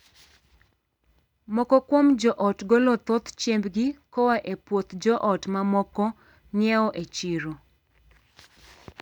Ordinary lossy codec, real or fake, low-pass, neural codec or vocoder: none; real; 19.8 kHz; none